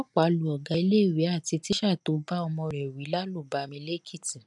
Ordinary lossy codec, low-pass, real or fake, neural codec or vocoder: none; 10.8 kHz; real; none